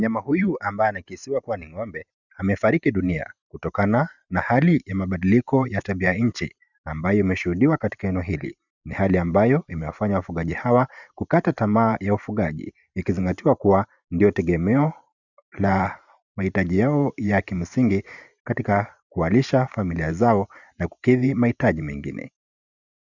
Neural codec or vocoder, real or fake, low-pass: vocoder, 44.1 kHz, 128 mel bands every 512 samples, BigVGAN v2; fake; 7.2 kHz